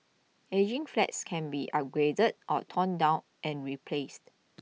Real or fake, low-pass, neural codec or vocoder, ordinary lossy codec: real; none; none; none